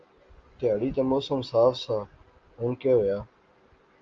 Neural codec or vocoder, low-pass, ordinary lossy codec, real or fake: none; 7.2 kHz; Opus, 32 kbps; real